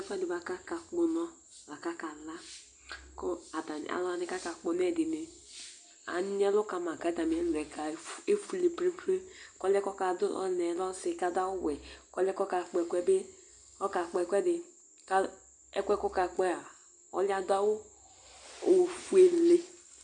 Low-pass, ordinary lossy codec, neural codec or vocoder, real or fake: 9.9 kHz; MP3, 96 kbps; none; real